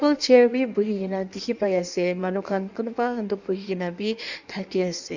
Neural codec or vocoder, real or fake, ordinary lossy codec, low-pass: codec, 16 kHz in and 24 kHz out, 1.1 kbps, FireRedTTS-2 codec; fake; none; 7.2 kHz